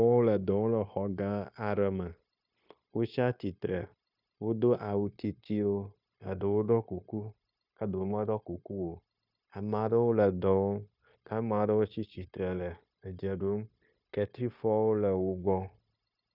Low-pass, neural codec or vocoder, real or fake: 5.4 kHz; codec, 16 kHz, 0.9 kbps, LongCat-Audio-Codec; fake